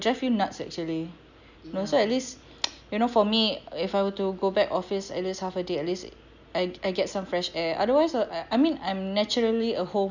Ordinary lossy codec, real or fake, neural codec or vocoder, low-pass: none; real; none; 7.2 kHz